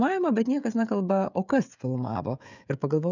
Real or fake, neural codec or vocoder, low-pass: fake; codec, 16 kHz, 16 kbps, FreqCodec, smaller model; 7.2 kHz